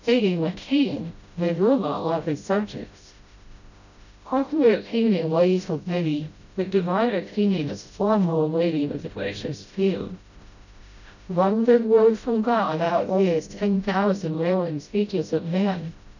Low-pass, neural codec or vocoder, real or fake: 7.2 kHz; codec, 16 kHz, 0.5 kbps, FreqCodec, smaller model; fake